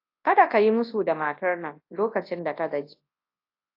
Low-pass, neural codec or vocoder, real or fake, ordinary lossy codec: 5.4 kHz; codec, 24 kHz, 0.9 kbps, WavTokenizer, large speech release; fake; AAC, 32 kbps